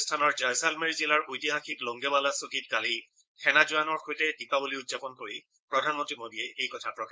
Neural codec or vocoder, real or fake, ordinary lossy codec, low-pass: codec, 16 kHz, 4.8 kbps, FACodec; fake; none; none